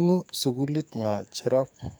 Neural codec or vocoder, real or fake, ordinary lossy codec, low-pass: codec, 44.1 kHz, 2.6 kbps, SNAC; fake; none; none